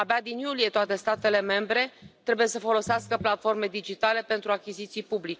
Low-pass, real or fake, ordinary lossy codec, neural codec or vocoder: none; real; none; none